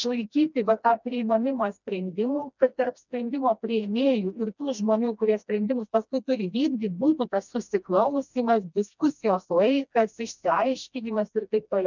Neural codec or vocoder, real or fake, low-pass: codec, 16 kHz, 1 kbps, FreqCodec, smaller model; fake; 7.2 kHz